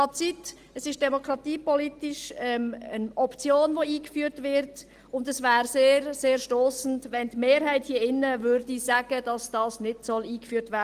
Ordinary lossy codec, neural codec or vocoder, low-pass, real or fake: Opus, 24 kbps; none; 14.4 kHz; real